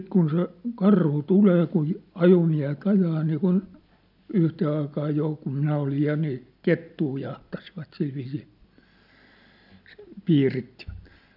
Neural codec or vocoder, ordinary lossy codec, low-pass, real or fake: none; none; 5.4 kHz; real